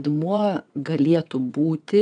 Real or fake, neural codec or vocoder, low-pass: fake; vocoder, 22.05 kHz, 80 mel bands, WaveNeXt; 9.9 kHz